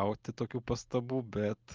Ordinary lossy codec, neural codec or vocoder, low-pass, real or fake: Opus, 24 kbps; none; 7.2 kHz; real